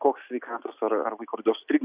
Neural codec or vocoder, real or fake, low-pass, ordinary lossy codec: none; real; 3.6 kHz; Opus, 64 kbps